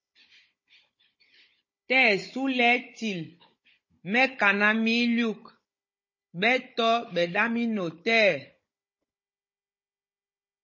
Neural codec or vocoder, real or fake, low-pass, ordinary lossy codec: codec, 16 kHz, 16 kbps, FunCodec, trained on Chinese and English, 50 frames a second; fake; 7.2 kHz; MP3, 32 kbps